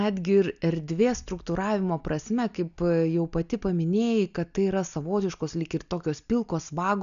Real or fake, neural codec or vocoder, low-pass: real; none; 7.2 kHz